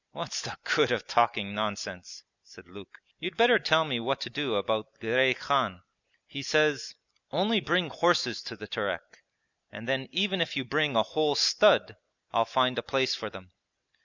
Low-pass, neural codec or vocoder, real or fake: 7.2 kHz; none; real